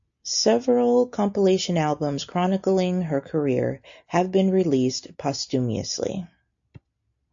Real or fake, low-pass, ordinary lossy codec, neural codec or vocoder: real; 7.2 kHz; AAC, 64 kbps; none